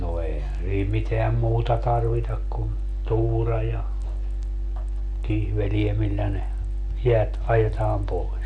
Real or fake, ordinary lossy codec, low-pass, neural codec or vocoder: real; MP3, 64 kbps; 9.9 kHz; none